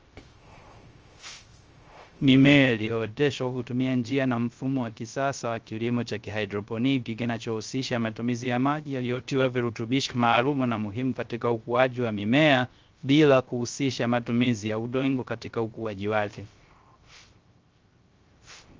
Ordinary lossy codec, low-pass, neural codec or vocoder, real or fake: Opus, 24 kbps; 7.2 kHz; codec, 16 kHz, 0.3 kbps, FocalCodec; fake